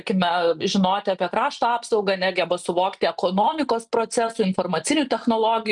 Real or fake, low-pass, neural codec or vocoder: real; 10.8 kHz; none